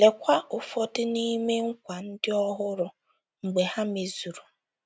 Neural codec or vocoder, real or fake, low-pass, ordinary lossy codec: none; real; none; none